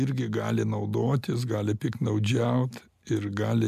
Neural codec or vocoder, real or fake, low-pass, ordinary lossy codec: none; real; 14.4 kHz; MP3, 96 kbps